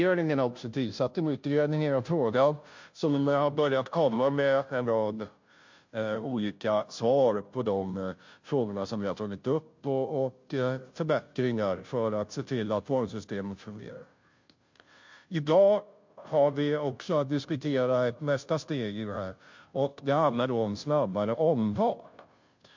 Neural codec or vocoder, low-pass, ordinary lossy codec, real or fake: codec, 16 kHz, 0.5 kbps, FunCodec, trained on Chinese and English, 25 frames a second; 7.2 kHz; MP3, 48 kbps; fake